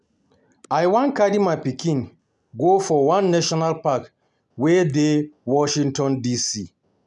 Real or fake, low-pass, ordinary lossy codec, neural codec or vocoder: real; 10.8 kHz; none; none